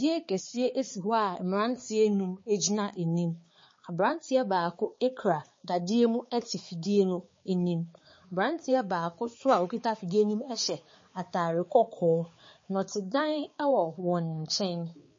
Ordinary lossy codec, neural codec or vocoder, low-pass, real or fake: MP3, 32 kbps; codec, 16 kHz, 4 kbps, X-Codec, HuBERT features, trained on balanced general audio; 7.2 kHz; fake